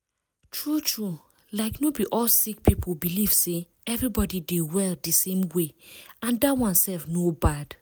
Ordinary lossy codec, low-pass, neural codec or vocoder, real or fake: none; none; none; real